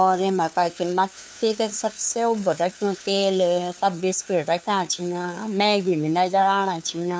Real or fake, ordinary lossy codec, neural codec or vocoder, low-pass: fake; none; codec, 16 kHz, 2 kbps, FunCodec, trained on LibriTTS, 25 frames a second; none